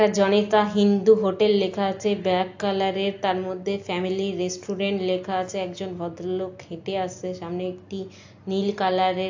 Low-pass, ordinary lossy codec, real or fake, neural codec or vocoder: 7.2 kHz; AAC, 48 kbps; real; none